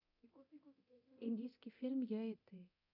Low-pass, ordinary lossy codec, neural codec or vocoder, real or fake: 5.4 kHz; none; codec, 24 kHz, 0.9 kbps, DualCodec; fake